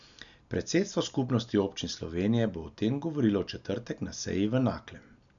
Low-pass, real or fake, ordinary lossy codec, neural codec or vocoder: 7.2 kHz; real; none; none